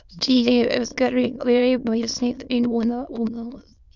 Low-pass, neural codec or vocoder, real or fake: 7.2 kHz; autoencoder, 22.05 kHz, a latent of 192 numbers a frame, VITS, trained on many speakers; fake